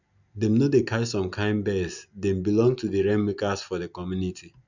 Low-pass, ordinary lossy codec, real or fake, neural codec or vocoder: 7.2 kHz; none; real; none